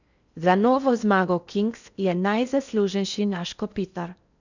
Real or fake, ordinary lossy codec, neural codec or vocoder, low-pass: fake; none; codec, 16 kHz in and 24 kHz out, 0.6 kbps, FocalCodec, streaming, 4096 codes; 7.2 kHz